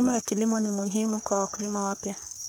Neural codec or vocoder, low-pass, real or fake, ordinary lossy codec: codec, 44.1 kHz, 3.4 kbps, Pupu-Codec; none; fake; none